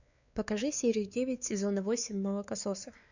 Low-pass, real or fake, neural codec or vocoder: 7.2 kHz; fake; codec, 16 kHz, 4 kbps, X-Codec, WavLM features, trained on Multilingual LibriSpeech